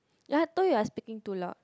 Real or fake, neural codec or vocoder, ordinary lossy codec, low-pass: real; none; none; none